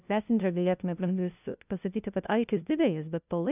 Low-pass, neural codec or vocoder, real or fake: 3.6 kHz; codec, 16 kHz, 0.5 kbps, FunCodec, trained on LibriTTS, 25 frames a second; fake